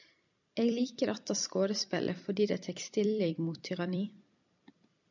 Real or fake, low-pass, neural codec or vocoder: fake; 7.2 kHz; vocoder, 44.1 kHz, 128 mel bands every 256 samples, BigVGAN v2